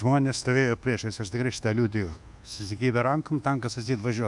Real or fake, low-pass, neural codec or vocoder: fake; 10.8 kHz; codec, 24 kHz, 1.2 kbps, DualCodec